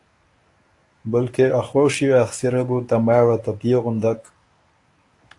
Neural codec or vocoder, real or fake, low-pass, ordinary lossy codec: codec, 24 kHz, 0.9 kbps, WavTokenizer, medium speech release version 1; fake; 10.8 kHz; AAC, 48 kbps